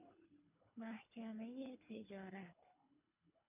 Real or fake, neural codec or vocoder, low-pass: fake; codec, 24 kHz, 3 kbps, HILCodec; 3.6 kHz